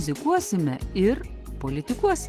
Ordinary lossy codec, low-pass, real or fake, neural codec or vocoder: Opus, 16 kbps; 14.4 kHz; real; none